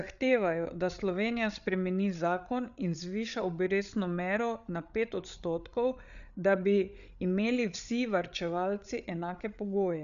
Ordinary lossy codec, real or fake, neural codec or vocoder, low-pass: none; fake; codec, 16 kHz, 8 kbps, FreqCodec, larger model; 7.2 kHz